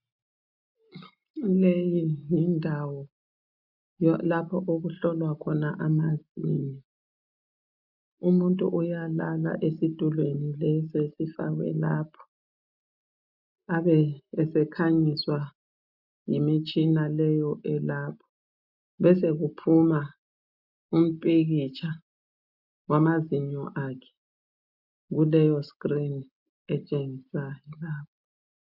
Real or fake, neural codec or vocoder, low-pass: real; none; 5.4 kHz